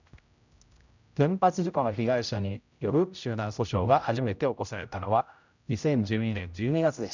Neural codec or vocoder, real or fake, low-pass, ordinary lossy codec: codec, 16 kHz, 0.5 kbps, X-Codec, HuBERT features, trained on general audio; fake; 7.2 kHz; none